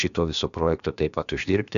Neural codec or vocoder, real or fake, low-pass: codec, 16 kHz, about 1 kbps, DyCAST, with the encoder's durations; fake; 7.2 kHz